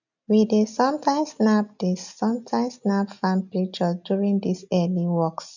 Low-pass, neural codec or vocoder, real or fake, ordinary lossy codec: 7.2 kHz; none; real; none